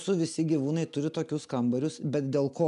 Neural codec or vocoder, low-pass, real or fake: none; 10.8 kHz; real